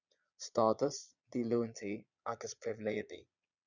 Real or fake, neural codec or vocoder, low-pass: fake; vocoder, 22.05 kHz, 80 mel bands, Vocos; 7.2 kHz